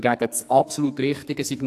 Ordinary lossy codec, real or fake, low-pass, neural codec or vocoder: AAC, 64 kbps; fake; 14.4 kHz; codec, 44.1 kHz, 2.6 kbps, SNAC